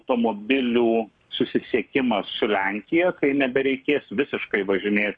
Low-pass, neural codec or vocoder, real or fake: 9.9 kHz; codec, 44.1 kHz, 7.8 kbps, Pupu-Codec; fake